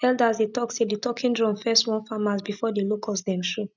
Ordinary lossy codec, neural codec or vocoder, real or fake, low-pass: none; none; real; 7.2 kHz